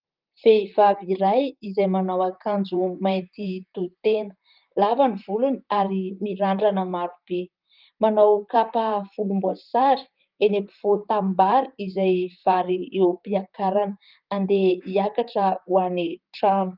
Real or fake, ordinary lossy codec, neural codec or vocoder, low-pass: fake; Opus, 24 kbps; vocoder, 44.1 kHz, 128 mel bands, Pupu-Vocoder; 5.4 kHz